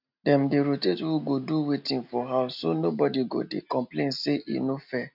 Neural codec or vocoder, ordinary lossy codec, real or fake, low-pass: none; none; real; 5.4 kHz